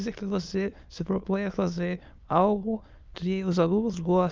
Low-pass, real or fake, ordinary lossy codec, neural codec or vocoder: 7.2 kHz; fake; Opus, 24 kbps; autoencoder, 22.05 kHz, a latent of 192 numbers a frame, VITS, trained on many speakers